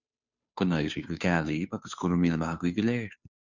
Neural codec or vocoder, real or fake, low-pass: codec, 16 kHz, 2 kbps, FunCodec, trained on Chinese and English, 25 frames a second; fake; 7.2 kHz